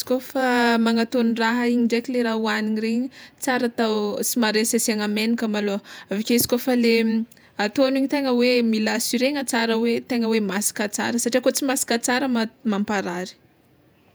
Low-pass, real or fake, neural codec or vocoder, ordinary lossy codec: none; fake; vocoder, 48 kHz, 128 mel bands, Vocos; none